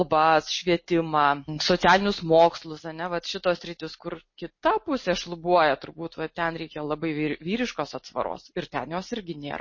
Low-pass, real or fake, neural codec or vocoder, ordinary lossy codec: 7.2 kHz; real; none; MP3, 32 kbps